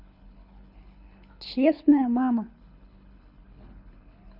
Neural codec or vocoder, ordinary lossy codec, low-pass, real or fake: codec, 24 kHz, 6 kbps, HILCodec; none; 5.4 kHz; fake